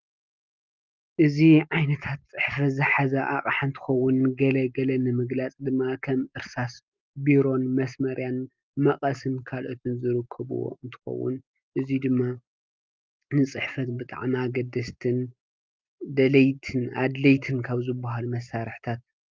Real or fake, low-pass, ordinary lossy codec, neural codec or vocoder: real; 7.2 kHz; Opus, 32 kbps; none